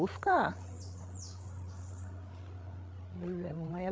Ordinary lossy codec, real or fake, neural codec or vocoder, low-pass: none; fake; codec, 16 kHz, 8 kbps, FreqCodec, larger model; none